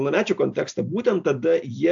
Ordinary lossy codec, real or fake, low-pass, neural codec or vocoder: AAC, 64 kbps; real; 7.2 kHz; none